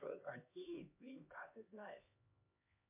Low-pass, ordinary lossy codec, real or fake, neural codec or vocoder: 3.6 kHz; MP3, 24 kbps; fake; codec, 16 kHz, 2 kbps, X-Codec, HuBERT features, trained on LibriSpeech